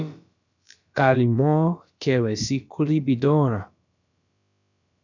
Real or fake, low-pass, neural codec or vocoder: fake; 7.2 kHz; codec, 16 kHz, about 1 kbps, DyCAST, with the encoder's durations